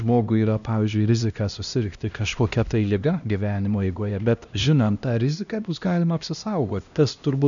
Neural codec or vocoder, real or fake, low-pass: codec, 16 kHz, 1 kbps, X-Codec, HuBERT features, trained on LibriSpeech; fake; 7.2 kHz